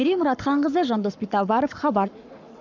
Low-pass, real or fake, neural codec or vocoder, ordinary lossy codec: 7.2 kHz; fake; codec, 44.1 kHz, 7.8 kbps, Pupu-Codec; none